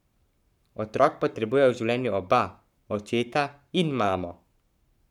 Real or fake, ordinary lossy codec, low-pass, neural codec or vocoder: fake; none; 19.8 kHz; codec, 44.1 kHz, 7.8 kbps, Pupu-Codec